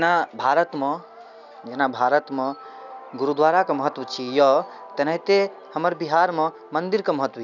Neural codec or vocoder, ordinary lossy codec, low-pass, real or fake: none; none; 7.2 kHz; real